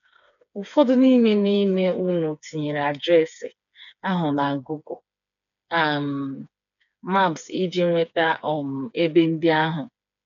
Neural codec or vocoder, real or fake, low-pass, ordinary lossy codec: codec, 16 kHz, 4 kbps, FreqCodec, smaller model; fake; 7.2 kHz; none